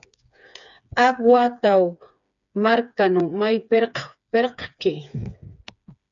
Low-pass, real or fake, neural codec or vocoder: 7.2 kHz; fake; codec, 16 kHz, 4 kbps, FreqCodec, smaller model